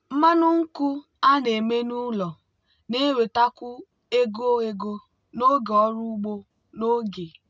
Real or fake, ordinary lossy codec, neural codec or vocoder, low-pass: real; none; none; none